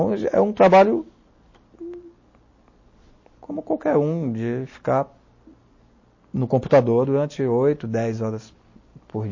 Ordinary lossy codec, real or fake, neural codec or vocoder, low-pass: MP3, 32 kbps; real; none; 7.2 kHz